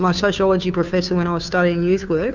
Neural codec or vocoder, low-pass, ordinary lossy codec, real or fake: codec, 16 kHz, 2 kbps, FunCodec, trained on Chinese and English, 25 frames a second; 7.2 kHz; Opus, 64 kbps; fake